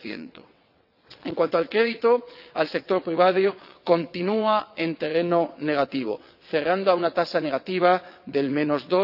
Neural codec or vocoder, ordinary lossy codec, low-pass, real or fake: vocoder, 22.05 kHz, 80 mel bands, WaveNeXt; none; 5.4 kHz; fake